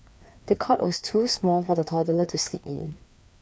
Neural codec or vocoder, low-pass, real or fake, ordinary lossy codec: codec, 16 kHz, 2 kbps, FreqCodec, larger model; none; fake; none